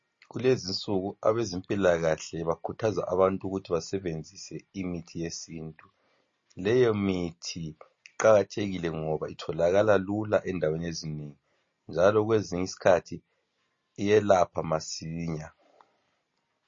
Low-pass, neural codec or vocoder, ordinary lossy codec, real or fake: 7.2 kHz; none; MP3, 32 kbps; real